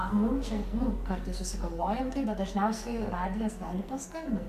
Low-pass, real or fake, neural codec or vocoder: 14.4 kHz; fake; codec, 44.1 kHz, 2.6 kbps, SNAC